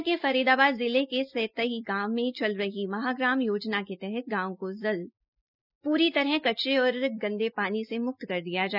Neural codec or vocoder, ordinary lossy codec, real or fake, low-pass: none; none; real; 5.4 kHz